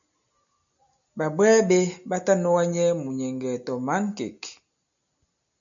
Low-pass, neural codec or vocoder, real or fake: 7.2 kHz; none; real